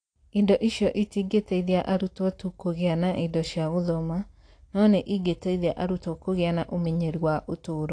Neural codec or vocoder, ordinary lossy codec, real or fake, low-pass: none; AAC, 48 kbps; real; 9.9 kHz